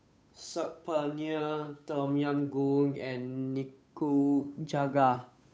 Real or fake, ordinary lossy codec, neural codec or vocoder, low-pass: fake; none; codec, 16 kHz, 8 kbps, FunCodec, trained on Chinese and English, 25 frames a second; none